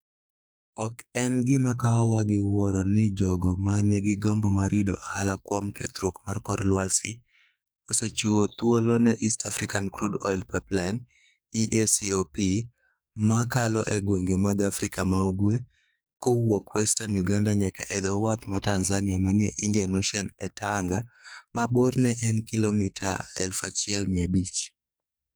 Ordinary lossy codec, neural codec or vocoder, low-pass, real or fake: none; codec, 44.1 kHz, 2.6 kbps, SNAC; none; fake